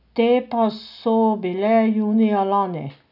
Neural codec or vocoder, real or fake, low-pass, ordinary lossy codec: none; real; 5.4 kHz; none